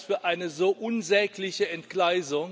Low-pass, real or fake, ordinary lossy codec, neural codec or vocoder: none; real; none; none